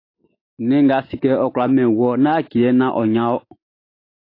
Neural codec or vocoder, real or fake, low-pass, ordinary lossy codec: none; real; 5.4 kHz; AAC, 32 kbps